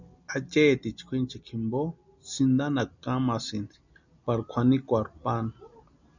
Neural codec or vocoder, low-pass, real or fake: none; 7.2 kHz; real